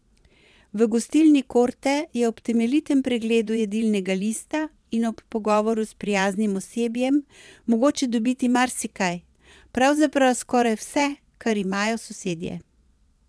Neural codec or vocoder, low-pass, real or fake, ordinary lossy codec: vocoder, 22.05 kHz, 80 mel bands, Vocos; none; fake; none